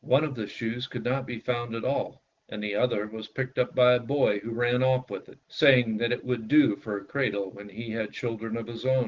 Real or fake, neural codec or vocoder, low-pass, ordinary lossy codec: real; none; 7.2 kHz; Opus, 16 kbps